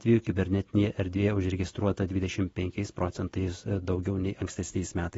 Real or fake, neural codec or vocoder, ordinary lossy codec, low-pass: real; none; AAC, 24 kbps; 19.8 kHz